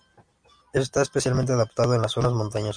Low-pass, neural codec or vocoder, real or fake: 9.9 kHz; none; real